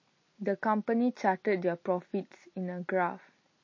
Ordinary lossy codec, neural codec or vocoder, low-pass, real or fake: MP3, 32 kbps; none; 7.2 kHz; real